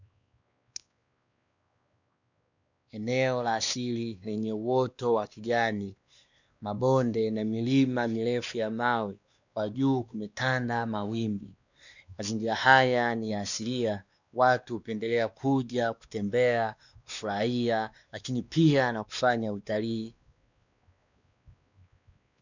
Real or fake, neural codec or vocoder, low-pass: fake; codec, 16 kHz, 2 kbps, X-Codec, WavLM features, trained on Multilingual LibriSpeech; 7.2 kHz